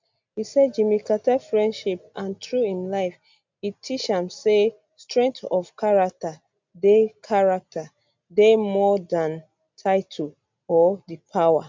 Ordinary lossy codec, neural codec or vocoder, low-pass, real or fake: MP3, 64 kbps; none; 7.2 kHz; real